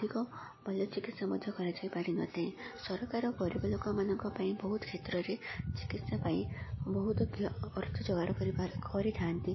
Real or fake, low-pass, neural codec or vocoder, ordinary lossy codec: real; 7.2 kHz; none; MP3, 24 kbps